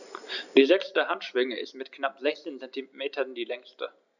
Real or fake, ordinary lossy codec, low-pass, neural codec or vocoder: real; none; 7.2 kHz; none